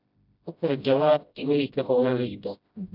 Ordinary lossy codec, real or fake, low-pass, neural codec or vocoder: none; fake; 5.4 kHz; codec, 16 kHz, 0.5 kbps, FreqCodec, smaller model